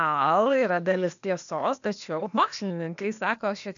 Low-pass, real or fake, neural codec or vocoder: 7.2 kHz; fake; codec, 16 kHz, 0.8 kbps, ZipCodec